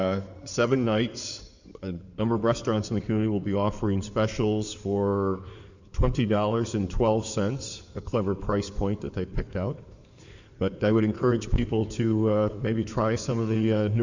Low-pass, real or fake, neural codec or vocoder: 7.2 kHz; fake; codec, 16 kHz in and 24 kHz out, 2.2 kbps, FireRedTTS-2 codec